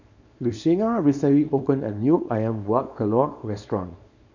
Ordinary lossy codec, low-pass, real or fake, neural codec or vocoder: none; 7.2 kHz; fake; codec, 24 kHz, 0.9 kbps, WavTokenizer, small release